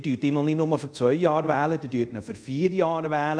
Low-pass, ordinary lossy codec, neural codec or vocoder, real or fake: 9.9 kHz; none; codec, 24 kHz, 0.5 kbps, DualCodec; fake